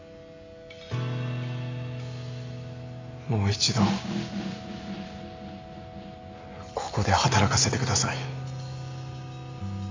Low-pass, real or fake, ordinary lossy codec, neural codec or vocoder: 7.2 kHz; real; MP3, 48 kbps; none